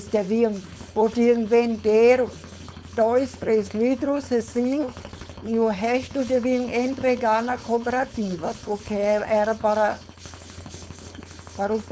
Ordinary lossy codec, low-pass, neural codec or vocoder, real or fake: none; none; codec, 16 kHz, 4.8 kbps, FACodec; fake